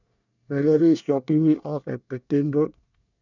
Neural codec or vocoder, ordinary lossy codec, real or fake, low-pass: codec, 24 kHz, 1 kbps, SNAC; none; fake; 7.2 kHz